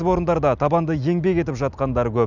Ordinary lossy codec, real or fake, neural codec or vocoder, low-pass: none; real; none; 7.2 kHz